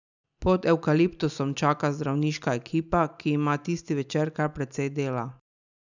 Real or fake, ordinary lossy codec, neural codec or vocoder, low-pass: real; none; none; 7.2 kHz